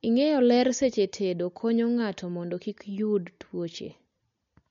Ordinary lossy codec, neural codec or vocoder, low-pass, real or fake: MP3, 48 kbps; none; 7.2 kHz; real